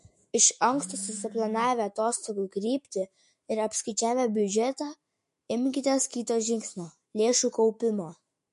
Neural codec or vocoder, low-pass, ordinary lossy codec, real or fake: autoencoder, 48 kHz, 128 numbers a frame, DAC-VAE, trained on Japanese speech; 14.4 kHz; MP3, 48 kbps; fake